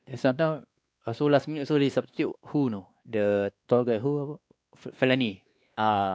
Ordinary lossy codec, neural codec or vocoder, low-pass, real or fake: none; codec, 16 kHz, 2 kbps, X-Codec, WavLM features, trained on Multilingual LibriSpeech; none; fake